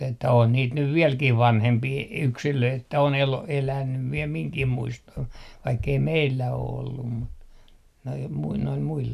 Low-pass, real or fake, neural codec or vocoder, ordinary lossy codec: 14.4 kHz; real; none; none